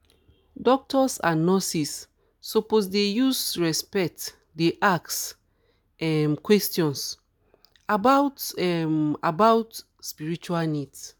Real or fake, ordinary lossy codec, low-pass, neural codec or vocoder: real; none; none; none